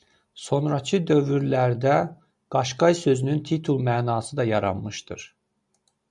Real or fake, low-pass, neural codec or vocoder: real; 10.8 kHz; none